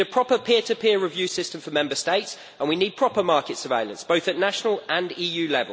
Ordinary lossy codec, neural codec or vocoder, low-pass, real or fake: none; none; none; real